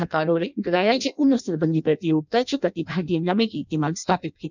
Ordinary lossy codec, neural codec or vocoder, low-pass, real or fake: none; codec, 16 kHz in and 24 kHz out, 0.6 kbps, FireRedTTS-2 codec; 7.2 kHz; fake